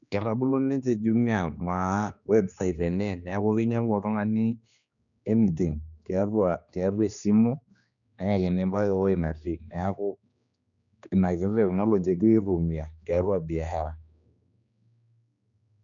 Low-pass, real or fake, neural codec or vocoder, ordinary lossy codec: 7.2 kHz; fake; codec, 16 kHz, 2 kbps, X-Codec, HuBERT features, trained on general audio; none